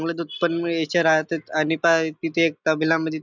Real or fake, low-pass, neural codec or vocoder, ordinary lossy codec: real; 7.2 kHz; none; none